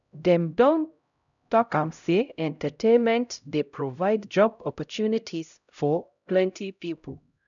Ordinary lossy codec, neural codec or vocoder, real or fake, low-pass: none; codec, 16 kHz, 0.5 kbps, X-Codec, HuBERT features, trained on LibriSpeech; fake; 7.2 kHz